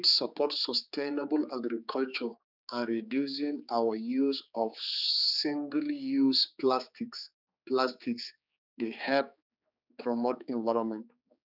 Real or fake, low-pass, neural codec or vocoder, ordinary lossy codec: fake; 5.4 kHz; codec, 16 kHz, 4 kbps, X-Codec, HuBERT features, trained on general audio; none